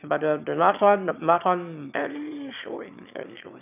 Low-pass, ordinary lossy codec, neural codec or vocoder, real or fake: 3.6 kHz; none; autoencoder, 22.05 kHz, a latent of 192 numbers a frame, VITS, trained on one speaker; fake